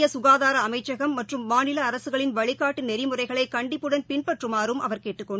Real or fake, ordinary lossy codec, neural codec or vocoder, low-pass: real; none; none; none